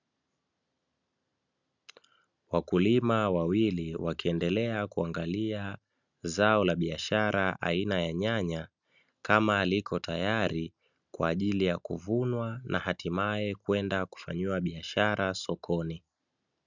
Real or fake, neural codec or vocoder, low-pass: real; none; 7.2 kHz